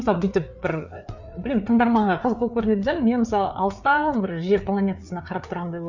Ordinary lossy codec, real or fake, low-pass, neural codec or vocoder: none; fake; 7.2 kHz; codec, 16 kHz, 4 kbps, FreqCodec, larger model